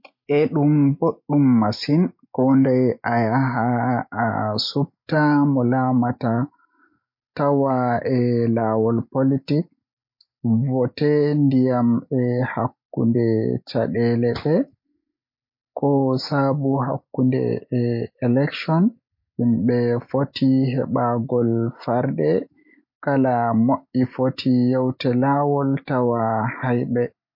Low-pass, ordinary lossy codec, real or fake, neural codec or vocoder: 5.4 kHz; MP3, 32 kbps; real; none